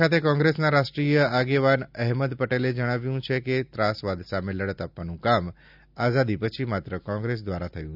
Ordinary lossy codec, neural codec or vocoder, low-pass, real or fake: none; none; 5.4 kHz; real